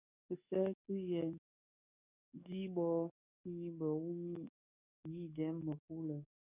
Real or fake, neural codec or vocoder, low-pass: fake; codec, 44.1 kHz, 7.8 kbps, DAC; 3.6 kHz